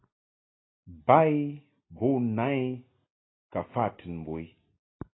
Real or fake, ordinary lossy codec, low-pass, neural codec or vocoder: real; AAC, 16 kbps; 7.2 kHz; none